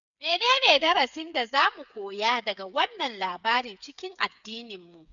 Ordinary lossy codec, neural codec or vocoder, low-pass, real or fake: none; codec, 16 kHz, 8 kbps, FreqCodec, smaller model; 7.2 kHz; fake